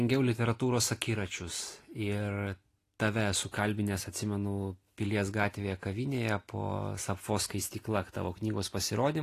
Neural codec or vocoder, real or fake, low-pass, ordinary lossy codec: none; real; 14.4 kHz; AAC, 48 kbps